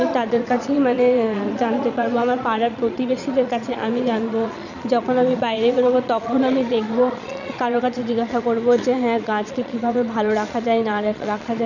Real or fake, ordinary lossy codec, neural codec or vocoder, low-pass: fake; none; vocoder, 22.05 kHz, 80 mel bands, Vocos; 7.2 kHz